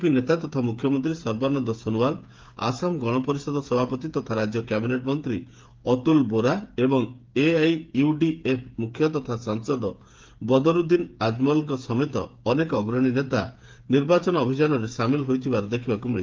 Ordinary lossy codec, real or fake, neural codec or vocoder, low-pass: Opus, 32 kbps; fake; codec, 16 kHz, 8 kbps, FreqCodec, smaller model; 7.2 kHz